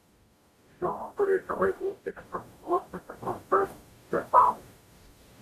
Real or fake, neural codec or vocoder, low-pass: fake; codec, 44.1 kHz, 0.9 kbps, DAC; 14.4 kHz